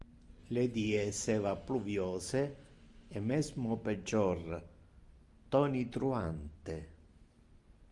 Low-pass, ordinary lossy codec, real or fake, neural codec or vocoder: 10.8 kHz; Opus, 32 kbps; real; none